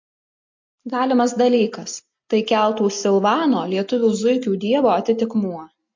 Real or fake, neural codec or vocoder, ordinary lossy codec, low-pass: real; none; MP3, 48 kbps; 7.2 kHz